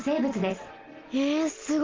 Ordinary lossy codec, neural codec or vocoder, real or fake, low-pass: Opus, 16 kbps; none; real; 7.2 kHz